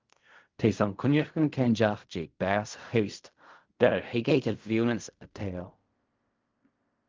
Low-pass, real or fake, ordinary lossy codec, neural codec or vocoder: 7.2 kHz; fake; Opus, 32 kbps; codec, 16 kHz in and 24 kHz out, 0.4 kbps, LongCat-Audio-Codec, fine tuned four codebook decoder